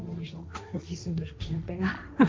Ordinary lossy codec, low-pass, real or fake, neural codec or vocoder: none; none; fake; codec, 16 kHz, 1.1 kbps, Voila-Tokenizer